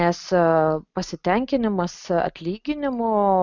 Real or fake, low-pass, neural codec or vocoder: real; 7.2 kHz; none